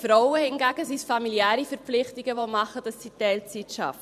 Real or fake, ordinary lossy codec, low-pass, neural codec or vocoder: fake; none; 14.4 kHz; vocoder, 44.1 kHz, 128 mel bands every 512 samples, BigVGAN v2